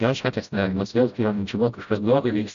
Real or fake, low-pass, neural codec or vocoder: fake; 7.2 kHz; codec, 16 kHz, 0.5 kbps, FreqCodec, smaller model